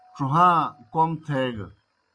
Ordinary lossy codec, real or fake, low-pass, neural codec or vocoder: Opus, 64 kbps; real; 9.9 kHz; none